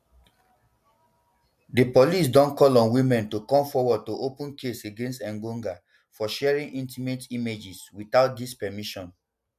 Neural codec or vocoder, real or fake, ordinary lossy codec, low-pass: none; real; MP3, 96 kbps; 14.4 kHz